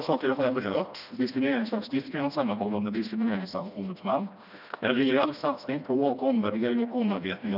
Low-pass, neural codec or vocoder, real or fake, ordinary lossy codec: 5.4 kHz; codec, 16 kHz, 1 kbps, FreqCodec, smaller model; fake; none